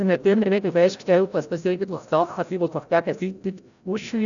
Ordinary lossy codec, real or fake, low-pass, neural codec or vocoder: none; fake; 7.2 kHz; codec, 16 kHz, 0.5 kbps, FreqCodec, larger model